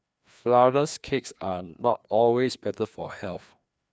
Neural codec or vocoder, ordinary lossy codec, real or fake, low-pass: codec, 16 kHz, 2 kbps, FreqCodec, larger model; none; fake; none